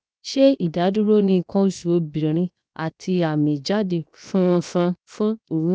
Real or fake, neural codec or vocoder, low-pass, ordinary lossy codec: fake; codec, 16 kHz, 0.7 kbps, FocalCodec; none; none